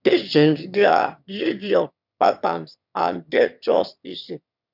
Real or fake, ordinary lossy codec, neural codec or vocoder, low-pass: fake; none; autoencoder, 22.05 kHz, a latent of 192 numbers a frame, VITS, trained on one speaker; 5.4 kHz